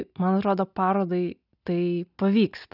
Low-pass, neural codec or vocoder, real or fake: 5.4 kHz; none; real